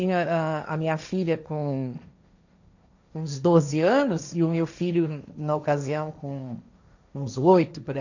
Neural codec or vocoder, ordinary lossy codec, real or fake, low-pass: codec, 16 kHz, 1.1 kbps, Voila-Tokenizer; none; fake; 7.2 kHz